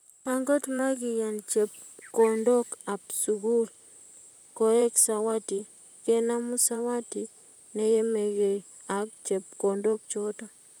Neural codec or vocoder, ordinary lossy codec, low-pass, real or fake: vocoder, 44.1 kHz, 128 mel bands, Pupu-Vocoder; none; none; fake